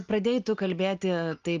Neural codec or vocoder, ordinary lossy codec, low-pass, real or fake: none; Opus, 32 kbps; 7.2 kHz; real